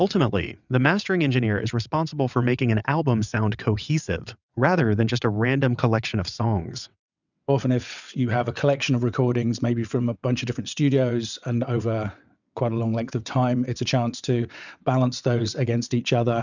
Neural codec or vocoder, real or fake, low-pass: vocoder, 22.05 kHz, 80 mel bands, WaveNeXt; fake; 7.2 kHz